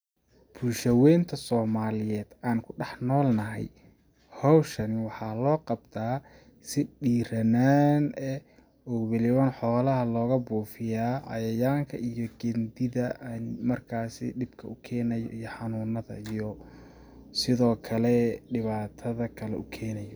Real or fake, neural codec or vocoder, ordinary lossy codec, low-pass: real; none; none; none